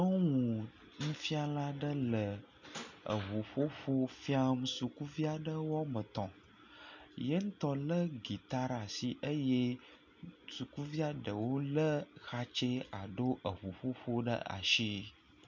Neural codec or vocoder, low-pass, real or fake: none; 7.2 kHz; real